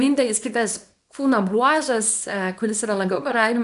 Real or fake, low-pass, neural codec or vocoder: fake; 10.8 kHz; codec, 24 kHz, 0.9 kbps, WavTokenizer, small release